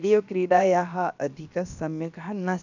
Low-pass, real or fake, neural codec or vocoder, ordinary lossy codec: 7.2 kHz; fake; codec, 16 kHz, about 1 kbps, DyCAST, with the encoder's durations; none